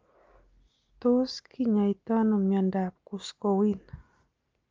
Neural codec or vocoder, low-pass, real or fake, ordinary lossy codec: none; 7.2 kHz; real; Opus, 24 kbps